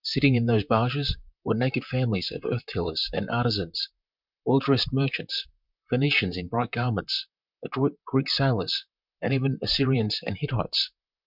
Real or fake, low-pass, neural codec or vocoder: fake; 5.4 kHz; vocoder, 44.1 kHz, 128 mel bands, Pupu-Vocoder